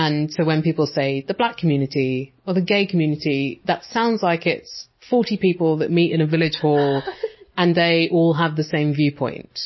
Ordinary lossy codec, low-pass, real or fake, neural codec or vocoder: MP3, 24 kbps; 7.2 kHz; real; none